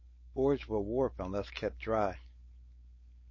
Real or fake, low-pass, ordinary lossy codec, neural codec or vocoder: fake; 7.2 kHz; MP3, 32 kbps; codec, 16 kHz, 4.8 kbps, FACodec